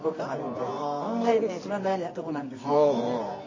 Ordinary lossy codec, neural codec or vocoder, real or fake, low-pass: MP3, 32 kbps; codec, 24 kHz, 0.9 kbps, WavTokenizer, medium music audio release; fake; 7.2 kHz